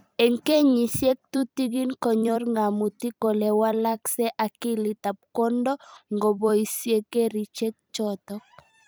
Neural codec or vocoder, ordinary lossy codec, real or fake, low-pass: vocoder, 44.1 kHz, 128 mel bands every 256 samples, BigVGAN v2; none; fake; none